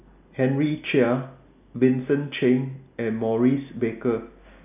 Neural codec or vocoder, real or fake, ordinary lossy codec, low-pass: none; real; none; 3.6 kHz